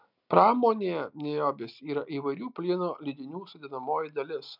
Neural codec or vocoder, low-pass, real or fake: none; 5.4 kHz; real